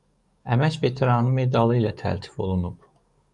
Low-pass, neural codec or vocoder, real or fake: 10.8 kHz; codec, 44.1 kHz, 7.8 kbps, DAC; fake